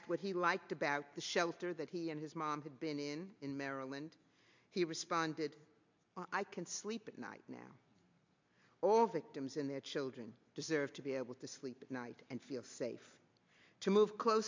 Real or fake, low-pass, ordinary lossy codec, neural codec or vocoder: real; 7.2 kHz; MP3, 64 kbps; none